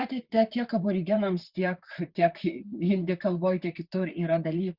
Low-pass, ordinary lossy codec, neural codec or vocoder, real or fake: 5.4 kHz; Opus, 64 kbps; vocoder, 44.1 kHz, 128 mel bands, Pupu-Vocoder; fake